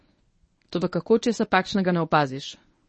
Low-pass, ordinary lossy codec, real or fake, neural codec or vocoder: 10.8 kHz; MP3, 32 kbps; fake; codec, 24 kHz, 0.9 kbps, WavTokenizer, medium speech release version 1